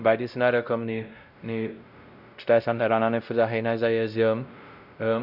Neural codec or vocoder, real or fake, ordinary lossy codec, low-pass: codec, 16 kHz, 0.5 kbps, X-Codec, WavLM features, trained on Multilingual LibriSpeech; fake; none; 5.4 kHz